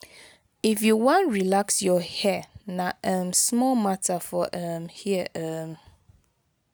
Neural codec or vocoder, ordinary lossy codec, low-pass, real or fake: none; none; none; real